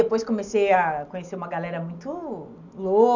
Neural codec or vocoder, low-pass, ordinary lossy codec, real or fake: none; 7.2 kHz; none; real